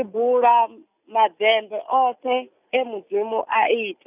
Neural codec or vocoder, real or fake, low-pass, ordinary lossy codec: none; real; 3.6 kHz; none